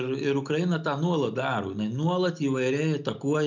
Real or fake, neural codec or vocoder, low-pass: real; none; 7.2 kHz